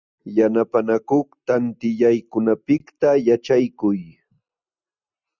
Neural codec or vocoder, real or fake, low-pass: none; real; 7.2 kHz